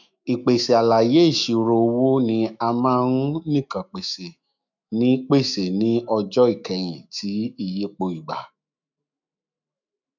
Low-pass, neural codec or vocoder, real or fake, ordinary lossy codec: 7.2 kHz; autoencoder, 48 kHz, 128 numbers a frame, DAC-VAE, trained on Japanese speech; fake; none